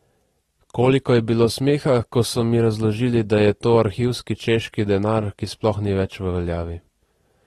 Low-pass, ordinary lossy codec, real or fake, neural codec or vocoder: 19.8 kHz; AAC, 32 kbps; real; none